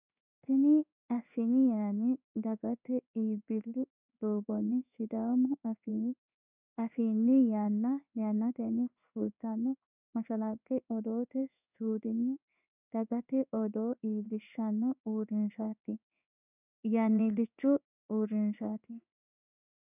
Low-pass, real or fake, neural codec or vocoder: 3.6 kHz; fake; codec, 16 kHz in and 24 kHz out, 1 kbps, XY-Tokenizer